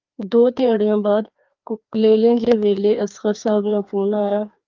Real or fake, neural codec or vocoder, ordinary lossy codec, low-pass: fake; codec, 16 kHz, 2 kbps, FreqCodec, larger model; Opus, 32 kbps; 7.2 kHz